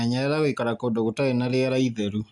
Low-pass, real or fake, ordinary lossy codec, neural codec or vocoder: 10.8 kHz; real; none; none